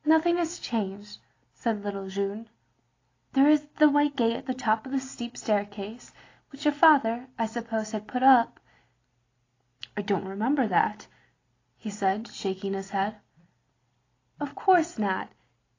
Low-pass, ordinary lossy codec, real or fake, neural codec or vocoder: 7.2 kHz; AAC, 32 kbps; real; none